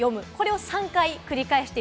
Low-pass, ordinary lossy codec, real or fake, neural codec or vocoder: none; none; real; none